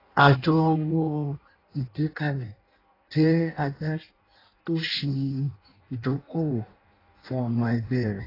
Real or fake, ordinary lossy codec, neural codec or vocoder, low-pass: fake; AAC, 24 kbps; codec, 16 kHz in and 24 kHz out, 0.6 kbps, FireRedTTS-2 codec; 5.4 kHz